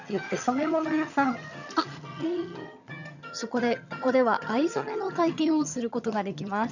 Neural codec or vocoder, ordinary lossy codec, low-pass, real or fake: vocoder, 22.05 kHz, 80 mel bands, HiFi-GAN; none; 7.2 kHz; fake